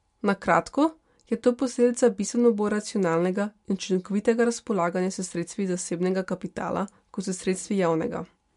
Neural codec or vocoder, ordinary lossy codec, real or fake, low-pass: none; MP3, 64 kbps; real; 10.8 kHz